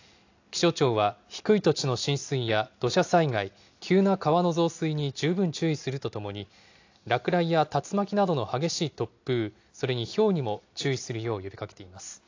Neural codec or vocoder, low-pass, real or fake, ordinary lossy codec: none; 7.2 kHz; real; AAC, 48 kbps